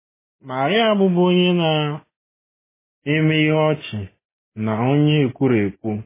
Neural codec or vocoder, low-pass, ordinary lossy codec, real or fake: none; 3.6 kHz; MP3, 16 kbps; real